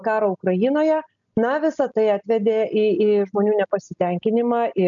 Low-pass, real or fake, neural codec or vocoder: 7.2 kHz; real; none